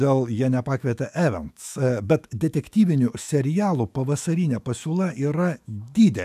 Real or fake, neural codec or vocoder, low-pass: fake; autoencoder, 48 kHz, 128 numbers a frame, DAC-VAE, trained on Japanese speech; 14.4 kHz